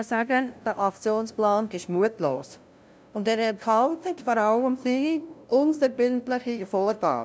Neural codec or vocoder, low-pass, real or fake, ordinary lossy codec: codec, 16 kHz, 0.5 kbps, FunCodec, trained on LibriTTS, 25 frames a second; none; fake; none